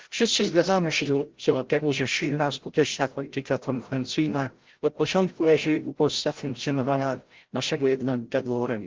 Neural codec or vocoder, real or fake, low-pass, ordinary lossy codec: codec, 16 kHz, 0.5 kbps, FreqCodec, larger model; fake; 7.2 kHz; Opus, 16 kbps